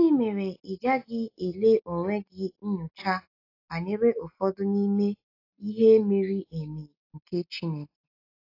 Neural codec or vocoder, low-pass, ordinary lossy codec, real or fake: none; 5.4 kHz; AAC, 32 kbps; real